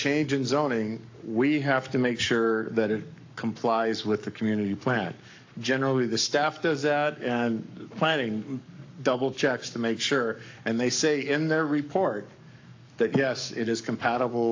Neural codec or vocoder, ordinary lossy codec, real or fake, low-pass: codec, 44.1 kHz, 7.8 kbps, Pupu-Codec; AAC, 48 kbps; fake; 7.2 kHz